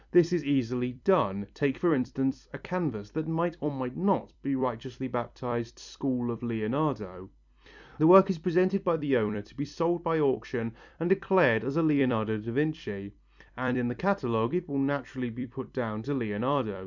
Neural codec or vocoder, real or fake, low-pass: vocoder, 44.1 kHz, 128 mel bands every 256 samples, BigVGAN v2; fake; 7.2 kHz